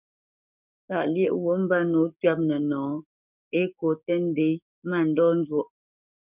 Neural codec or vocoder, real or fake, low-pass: codec, 44.1 kHz, 7.8 kbps, DAC; fake; 3.6 kHz